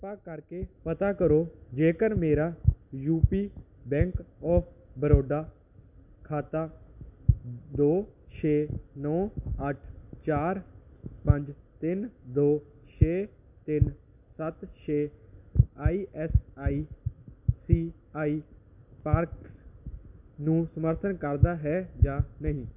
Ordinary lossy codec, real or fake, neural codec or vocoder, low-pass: none; real; none; 3.6 kHz